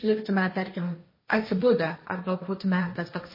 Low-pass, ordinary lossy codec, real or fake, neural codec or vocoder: 5.4 kHz; MP3, 24 kbps; fake; codec, 16 kHz, 1.1 kbps, Voila-Tokenizer